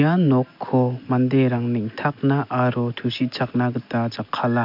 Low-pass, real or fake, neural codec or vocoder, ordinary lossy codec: 5.4 kHz; real; none; MP3, 48 kbps